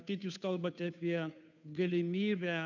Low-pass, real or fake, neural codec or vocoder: 7.2 kHz; fake; codec, 16 kHz, 2 kbps, FunCodec, trained on Chinese and English, 25 frames a second